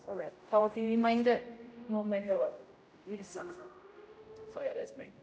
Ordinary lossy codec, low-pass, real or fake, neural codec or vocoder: none; none; fake; codec, 16 kHz, 0.5 kbps, X-Codec, HuBERT features, trained on general audio